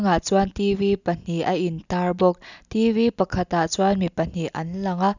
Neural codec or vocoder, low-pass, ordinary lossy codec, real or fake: none; 7.2 kHz; none; real